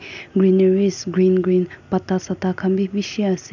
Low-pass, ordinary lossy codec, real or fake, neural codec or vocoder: 7.2 kHz; none; real; none